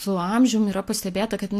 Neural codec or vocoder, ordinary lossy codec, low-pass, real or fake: none; AAC, 64 kbps; 14.4 kHz; real